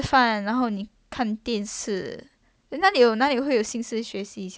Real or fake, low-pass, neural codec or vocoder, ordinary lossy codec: real; none; none; none